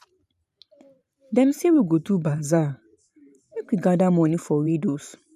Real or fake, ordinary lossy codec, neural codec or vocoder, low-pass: fake; none; vocoder, 44.1 kHz, 128 mel bands every 512 samples, BigVGAN v2; 14.4 kHz